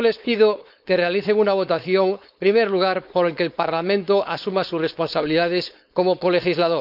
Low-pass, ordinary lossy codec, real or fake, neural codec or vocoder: 5.4 kHz; none; fake; codec, 16 kHz, 4.8 kbps, FACodec